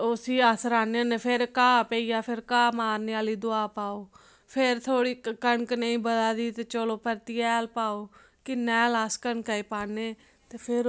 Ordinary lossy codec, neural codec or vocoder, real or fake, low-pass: none; none; real; none